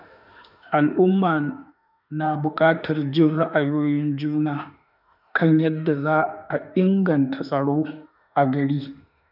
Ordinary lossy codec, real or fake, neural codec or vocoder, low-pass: none; fake; autoencoder, 48 kHz, 32 numbers a frame, DAC-VAE, trained on Japanese speech; 5.4 kHz